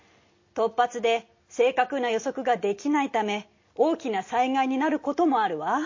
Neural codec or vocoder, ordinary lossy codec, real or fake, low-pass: none; MP3, 32 kbps; real; 7.2 kHz